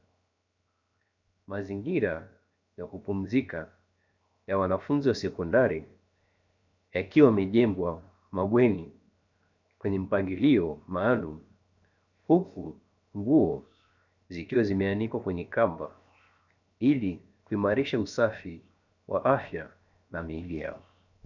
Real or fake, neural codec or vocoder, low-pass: fake; codec, 16 kHz, 0.7 kbps, FocalCodec; 7.2 kHz